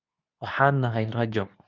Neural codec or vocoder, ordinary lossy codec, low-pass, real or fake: codec, 24 kHz, 0.9 kbps, WavTokenizer, medium speech release version 2; Opus, 64 kbps; 7.2 kHz; fake